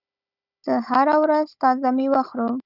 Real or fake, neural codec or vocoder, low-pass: fake; codec, 16 kHz, 16 kbps, FunCodec, trained on Chinese and English, 50 frames a second; 5.4 kHz